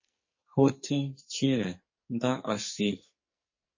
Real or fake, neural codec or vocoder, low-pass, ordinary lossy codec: fake; codec, 44.1 kHz, 2.6 kbps, SNAC; 7.2 kHz; MP3, 32 kbps